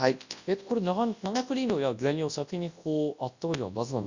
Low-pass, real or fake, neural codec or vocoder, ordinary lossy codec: 7.2 kHz; fake; codec, 24 kHz, 0.9 kbps, WavTokenizer, large speech release; Opus, 64 kbps